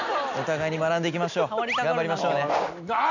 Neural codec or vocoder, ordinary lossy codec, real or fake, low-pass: none; none; real; 7.2 kHz